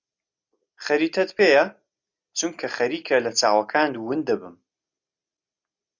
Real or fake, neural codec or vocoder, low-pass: real; none; 7.2 kHz